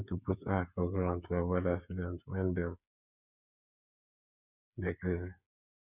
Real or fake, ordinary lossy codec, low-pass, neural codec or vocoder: fake; none; 3.6 kHz; codec, 16 kHz, 8 kbps, FreqCodec, smaller model